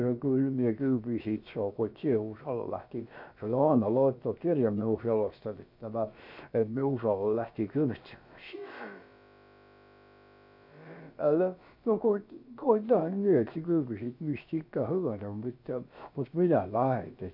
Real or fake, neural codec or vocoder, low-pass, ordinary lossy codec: fake; codec, 16 kHz, about 1 kbps, DyCAST, with the encoder's durations; 5.4 kHz; none